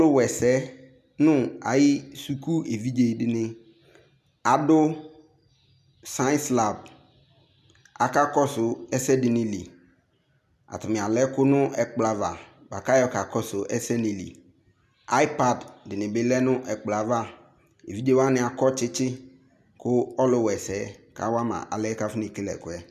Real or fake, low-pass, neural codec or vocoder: real; 14.4 kHz; none